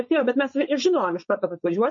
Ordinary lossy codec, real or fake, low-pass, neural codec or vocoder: MP3, 32 kbps; fake; 7.2 kHz; codec, 16 kHz, 4.8 kbps, FACodec